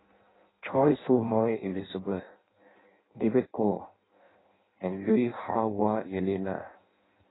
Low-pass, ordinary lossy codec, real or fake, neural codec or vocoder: 7.2 kHz; AAC, 16 kbps; fake; codec, 16 kHz in and 24 kHz out, 0.6 kbps, FireRedTTS-2 codec